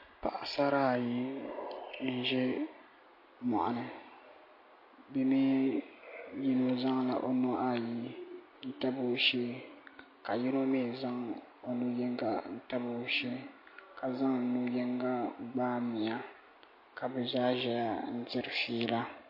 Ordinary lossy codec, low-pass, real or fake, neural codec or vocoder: MP3, 32 kbps; 5.4 kHz; real; none